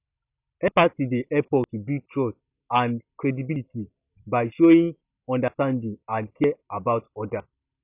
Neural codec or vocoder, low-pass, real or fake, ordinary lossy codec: none; 3.6 kHz; real; none